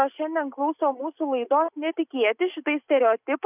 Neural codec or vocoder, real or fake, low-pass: none; real; 3.6 kHz